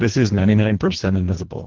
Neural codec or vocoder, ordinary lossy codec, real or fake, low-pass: codec, 24 kHz, 1.5 kbps, HILCodec; Opus, 16 kbps; fake; 7.2 kHz